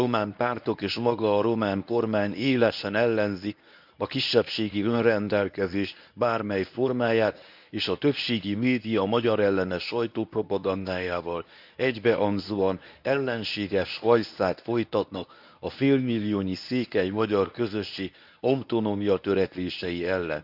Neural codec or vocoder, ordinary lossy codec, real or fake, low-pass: codec, 24 kHz, 0.9 kbps, WavTokenizer, medium speech release version 1; none; fake; 5.4 kHz